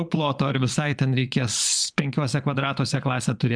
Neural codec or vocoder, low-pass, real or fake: vocoder, 22.05 kHz, 80 mel bands, Vocos; 9.9 kHz; fake